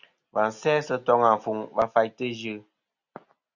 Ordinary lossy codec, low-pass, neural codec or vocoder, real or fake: Opus, 64 kbps; 7.2 kHz; none; real